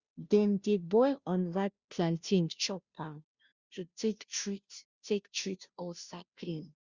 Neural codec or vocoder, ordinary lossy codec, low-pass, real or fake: codec, 16 kHz, 0.5 kbps, FunCodec, trained on Chinese and English, 25 frames a second; Opus, 64 kbps; 7.2 kHz; fake